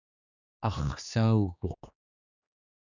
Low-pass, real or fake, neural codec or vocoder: 7.2 kHz; fake; codec, 16 kHz, 2 kbps, X-Codec, HuBERT features, trained on general audio